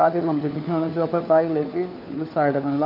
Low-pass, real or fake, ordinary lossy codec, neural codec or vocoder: 5.4 kHz; fake; none; codec, 16 kHz, 4 kbps, FunCodec, trained on LibriTTS, 50 frames a second